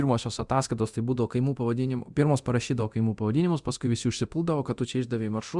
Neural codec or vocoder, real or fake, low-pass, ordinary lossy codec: codec, 24 kHz, 0.9 kbps, DualCodec; fake; 10.8 kHz; Opus, 64 kbps